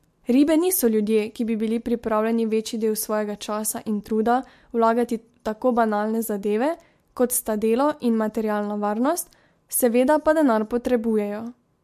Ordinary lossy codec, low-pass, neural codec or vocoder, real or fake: MP3, 64 kbps; 14.4 kHz; none; real